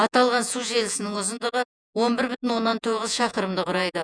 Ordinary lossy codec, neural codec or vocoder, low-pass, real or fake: none; vocoder, 48 kHz, 128 mel bands, Vocos; 9.9 kHz; fake